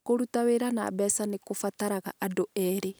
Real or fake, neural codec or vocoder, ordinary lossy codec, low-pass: real; none; none; none